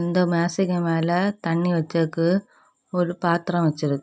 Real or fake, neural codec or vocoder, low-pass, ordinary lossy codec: real; none; none; none